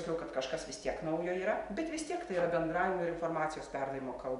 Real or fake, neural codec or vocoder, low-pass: real; none; 10.8 kHz